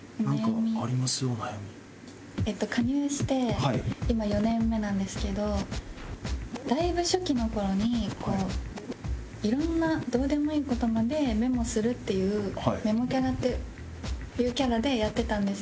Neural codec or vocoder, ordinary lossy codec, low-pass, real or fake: none; none; none; real